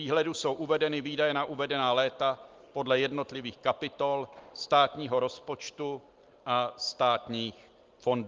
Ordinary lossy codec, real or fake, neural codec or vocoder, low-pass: Opus, 32 kbps; real; none; 7.2 kHz